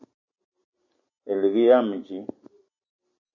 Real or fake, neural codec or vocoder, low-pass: real; none; 7.2 kHz